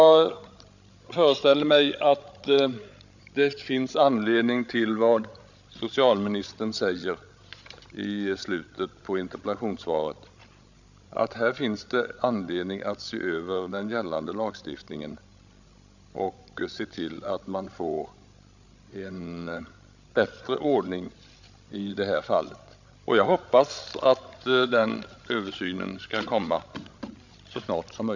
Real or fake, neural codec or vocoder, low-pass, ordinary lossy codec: fake; codec, 16 kHz, 16 kbps, FreqCodec, larger model; 7.2 kHz; none